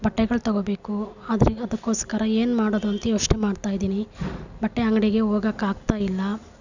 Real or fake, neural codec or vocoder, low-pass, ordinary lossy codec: real; none; 7.2 kHz; none